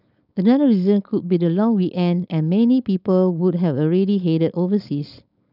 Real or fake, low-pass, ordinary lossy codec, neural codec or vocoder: fake; 5.4 kHz; none; codec, 16 kHz, 4.8 kbps, FACodec